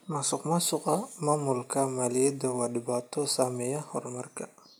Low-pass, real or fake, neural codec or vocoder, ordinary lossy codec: none; real; none; none